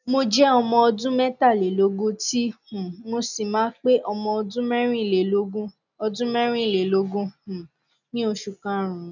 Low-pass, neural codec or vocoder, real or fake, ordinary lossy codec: 7.2 kHz; none; real; none